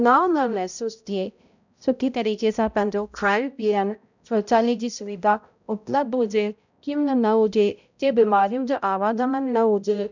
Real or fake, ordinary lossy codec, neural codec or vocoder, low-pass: fake; none; codec, 16 kHz, 0.5 kbps, X-Codec, HuBERT features, trained on balanced general audio; 7.2 kHz